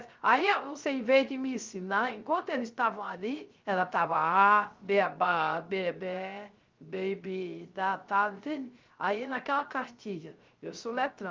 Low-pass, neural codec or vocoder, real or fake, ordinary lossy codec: 7.2 kHz; codec, 16 kHz, 0.3 kbps, FocalCodec; fake; Opus, 24 kbps